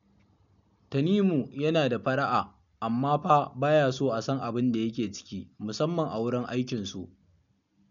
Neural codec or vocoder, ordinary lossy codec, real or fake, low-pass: none; none; real; 7.2 kHz